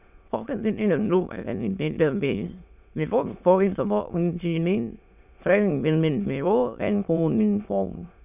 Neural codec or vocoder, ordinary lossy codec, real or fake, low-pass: autoencoder, 22.05 kHz, a latent of 192 numbers a frame, VITS, trained on many speakers; none; fake; 3.6 kHz